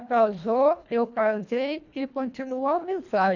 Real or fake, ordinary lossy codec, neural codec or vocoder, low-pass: fake; none; codec, 24 kHz, 1.5 kbps, HILCodec; 7.2 kHz